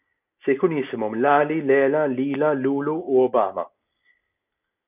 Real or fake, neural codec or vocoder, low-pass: real; none; 3.6 kHz